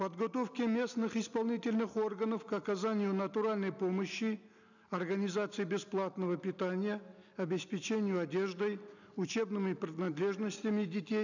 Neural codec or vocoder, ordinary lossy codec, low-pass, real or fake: none; none; 7.2 kHz; real